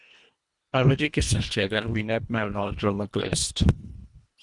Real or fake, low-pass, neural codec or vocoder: fake; 10.8 kHz; codec, 24 kHz, 1.5 kbps, HILCodec